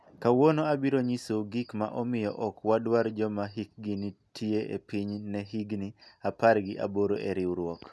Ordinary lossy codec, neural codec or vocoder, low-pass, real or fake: none; none; none; real